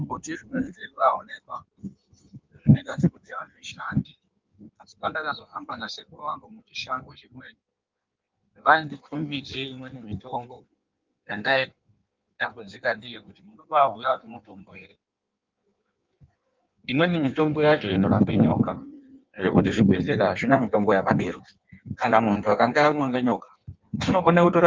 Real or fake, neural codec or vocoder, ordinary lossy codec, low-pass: fake; codec, 16 kHz in and 24 kHz out, 1.1 kbps, FireRedTTS-2 codec; Opus, 32 kbps; 7.2 kHz